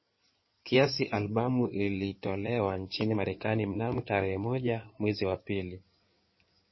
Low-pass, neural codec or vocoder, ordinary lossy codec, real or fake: 7.2 kHz; codec, 16 kHz in and 24 kHz out, 2.2 kbps, FireRedTTS-2 codec; MP3, 24 kbps; fake